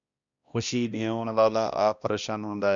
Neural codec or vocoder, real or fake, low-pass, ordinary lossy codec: codec, 16 kHz, 1 kbps, X-Codec, HuBERT features, trained on balanced general audio; fake; 7.2 kHz; Opus, 64 kbps